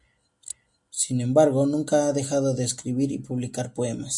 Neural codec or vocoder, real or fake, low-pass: none; real; 10.8 kHz